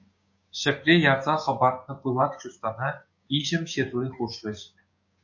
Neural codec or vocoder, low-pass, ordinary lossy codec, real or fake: codec, 16 kHz, 6 kbps, DAC; 7.2 kHz; MP3, 48 kbps; fake